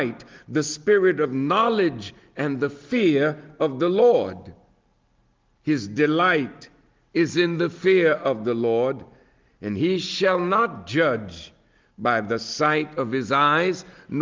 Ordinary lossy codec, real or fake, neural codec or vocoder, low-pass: Opus, 32 kbps; real; none; 7.2 kHz